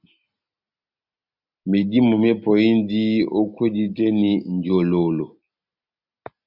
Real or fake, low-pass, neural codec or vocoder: real; 5.4 kHz; none